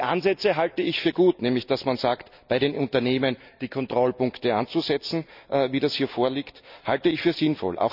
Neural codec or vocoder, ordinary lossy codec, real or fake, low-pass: none; none; real; 5.4 kHz